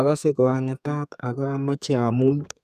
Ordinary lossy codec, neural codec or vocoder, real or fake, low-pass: none; codec, 32 kHz, 1.9 kbps, SNAC; fake; 14.4 kHz